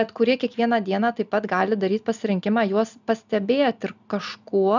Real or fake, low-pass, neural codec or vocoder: real; 7.2 kHz; none